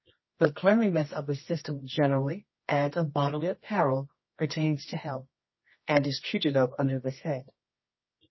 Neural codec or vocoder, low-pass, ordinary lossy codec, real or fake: codec, 24 kHz, 0.9 kbps, WavTokenizer, medium music audio release; 7.2 kHz; MP3, 24 kbps; fake